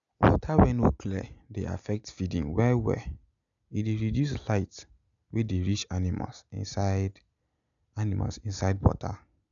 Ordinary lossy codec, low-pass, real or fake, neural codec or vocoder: none; 7.2 kHz; real; none